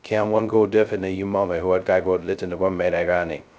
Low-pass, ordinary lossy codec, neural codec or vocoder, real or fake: none; none; codec, 16 kHz, 0.2 kbps, FocalCodec; fake